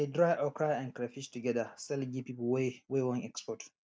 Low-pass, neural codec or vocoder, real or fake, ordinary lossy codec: none; none; real; none